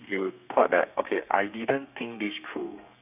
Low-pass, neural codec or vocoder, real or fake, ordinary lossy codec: 3.6 kHz; codec, 32 kHz, 1.9 kbps, SNAC; fake; none